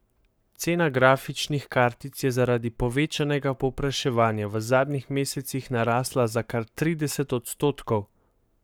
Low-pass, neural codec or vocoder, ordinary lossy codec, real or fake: none; codec, 44.1 kHz, 7.8 kbps, Pupu-Codec; none; fake